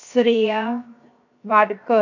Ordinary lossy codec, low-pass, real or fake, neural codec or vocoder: none; 7.2 kHz; fake; codec, 16 kHz, 0.7 kbps, FocalCodec